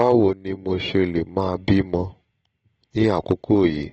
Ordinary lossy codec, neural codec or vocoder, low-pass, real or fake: AAC, 24 kbps; none; 9.9 kHz; real